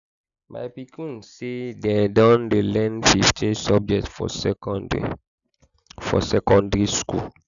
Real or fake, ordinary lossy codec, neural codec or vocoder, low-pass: real; none; none; 7.2 kHz